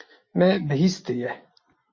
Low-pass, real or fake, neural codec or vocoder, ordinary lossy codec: 7.2 kHz; real; none; MP3, 32 kbps